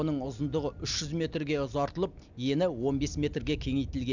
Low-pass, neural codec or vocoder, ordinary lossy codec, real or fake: 7.2 kHz; none; none; real